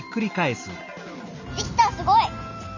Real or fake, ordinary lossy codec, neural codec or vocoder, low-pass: real; none; none; 7.2 kHz